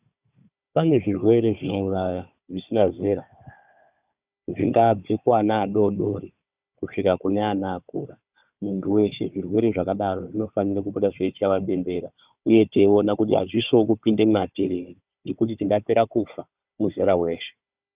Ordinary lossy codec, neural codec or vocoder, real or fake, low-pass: Opus, 64 kbps; codec, 16 kHz, 4 kbps, FunCodec, trained on Chinese and English, 50 frames a second; fake; 3.6 kHz